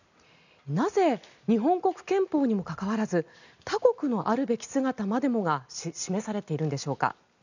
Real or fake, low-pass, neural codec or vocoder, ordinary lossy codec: real; 7.2 kHz; none; none